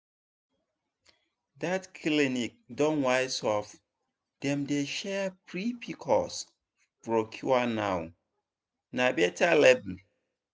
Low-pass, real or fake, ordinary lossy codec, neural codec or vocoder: none; real; none; none